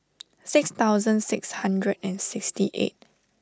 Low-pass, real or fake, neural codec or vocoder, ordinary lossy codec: none; real; none; none